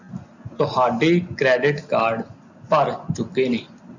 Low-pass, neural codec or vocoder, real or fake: 7.2 kHz; none; real